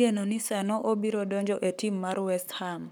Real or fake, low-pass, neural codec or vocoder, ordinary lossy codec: fake; none; codec, 44.1 kHz, 7.8 kbps, Pupu-Codec; none